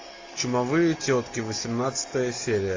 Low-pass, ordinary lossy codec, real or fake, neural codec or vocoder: 7.2 kHz; AAC, 32 kbps; real; none